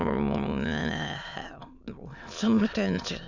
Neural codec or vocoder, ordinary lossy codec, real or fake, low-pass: autoencoder, 22.05 kHz, a latent of 192 numbers a frame, VITS, trained on many speakers; none; fake; 7.2 kHz